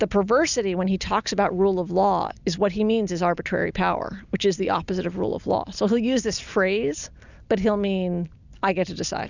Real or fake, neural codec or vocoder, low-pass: real; none; 7.2 kHz